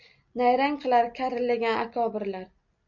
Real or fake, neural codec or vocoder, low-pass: real; none; 7.2 kHz